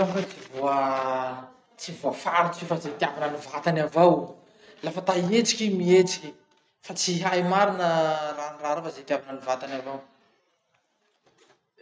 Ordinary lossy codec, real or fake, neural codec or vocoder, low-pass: none; real; none; none